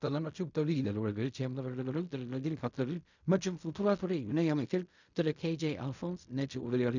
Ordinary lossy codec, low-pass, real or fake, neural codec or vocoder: none; 7.2 kHz; fake; codec, 16 kHz in and 24 kHz out, 0.4 kbps, LongCat-Audio-Codec, fine tuned four codebook decoder